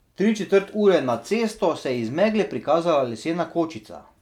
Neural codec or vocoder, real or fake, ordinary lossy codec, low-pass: none; real; none; 19.8 kHz